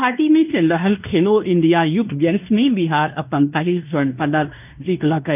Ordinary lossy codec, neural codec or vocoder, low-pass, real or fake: none; codec, 16 kHz in and 24 kHz out, 0.9 kbps, LongCat-Audio-Codec, fine tuned four codebook decoder; 3.6 kHz; fake